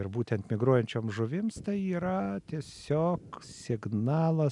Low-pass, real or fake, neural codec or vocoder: 10.8 kHz; real; none